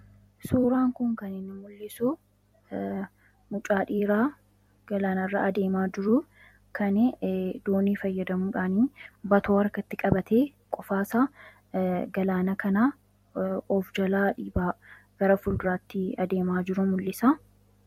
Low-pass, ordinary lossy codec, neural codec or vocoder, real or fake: 19.8 kHz; MP3, 64 kbps; vocoder, 44.1 kHz, 128 mel bands every 256 samples, BigVGAN v2; fake